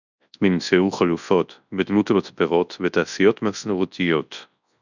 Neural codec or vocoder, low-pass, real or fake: codec, 24 kHz, 0.9 kbps, WavTokenizer, large speech release; 7.2 kHz; fake